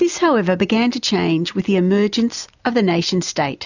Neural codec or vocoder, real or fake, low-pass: none; real; 7.2 kHz